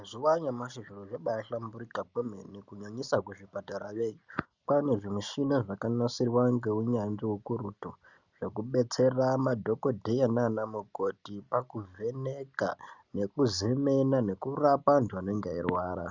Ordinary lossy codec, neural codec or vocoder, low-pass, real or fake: Opus, 64 kbps; none; 7.2 kHz; real